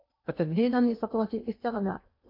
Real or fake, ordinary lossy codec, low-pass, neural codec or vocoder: fake; AAC, 32 kbps; 5.4 kHz; codec, 16 kHz in and 24 kHz out, 0.8 kbps, FocalCodec, streaming, 65536 codes